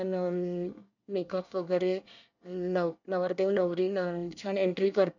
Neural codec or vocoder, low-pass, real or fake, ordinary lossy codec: codec, 24 kHz, 1 kbps, SNAC; 7.2 kHz; fake; none